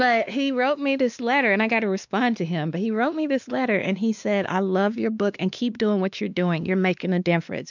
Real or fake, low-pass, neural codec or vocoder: fake; 7.2 kHz; codec, 16 kHz, 2 kbps, X-Codec, WavLM features, trained on Multilingual LibriSpeech